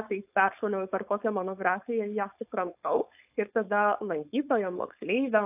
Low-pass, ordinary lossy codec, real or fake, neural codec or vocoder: 3.6 kHz; AAC, 32 kbps; fake; codec, 16 kHz, 4.8 kbps, FACodec